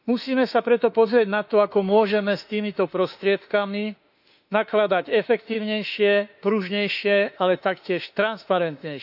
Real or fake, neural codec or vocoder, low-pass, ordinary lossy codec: fake; autoencoder, 48 kHz, 32 numbers a frame, DAC-VAE, trained on Japanese speech; 5.4 kHz; none